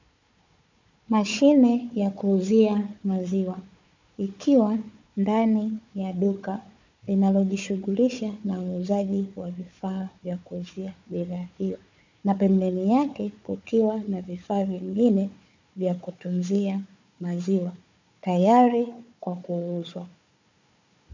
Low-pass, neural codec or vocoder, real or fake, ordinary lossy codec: 7.2 kHz; codec, 16 kHz, 4 kbps, FunCodec, trained on Chinese and English, 50 frames a second; fake; MP3, 64 kbps